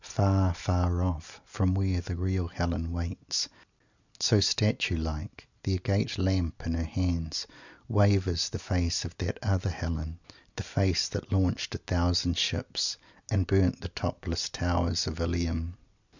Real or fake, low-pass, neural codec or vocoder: real; 7.2 kHz; none